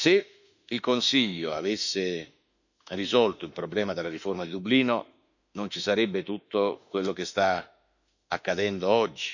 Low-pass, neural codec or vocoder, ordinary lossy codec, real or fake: 7.2 kHz; autoencoder, 48 kHz, 32 numbers a frame, DAC-VAE, trained on Japanese speech; none; fake